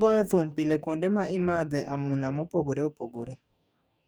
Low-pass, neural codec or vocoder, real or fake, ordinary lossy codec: none; codec, 44.1 kHz, 2.6 kbps, DAC; fake; none